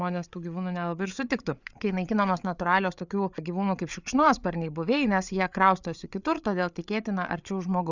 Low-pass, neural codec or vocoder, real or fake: 7.2 kHz; codec, 16 kHz, 8 kbps, FreqCodec, larger model; fake